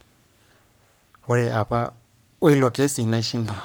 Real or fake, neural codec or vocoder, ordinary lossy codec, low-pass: fake; codec, 44.1 kHz, 3.4 kbps, Pupu-Codec; none; none